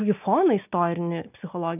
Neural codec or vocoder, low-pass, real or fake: none; 3.6 kHz; real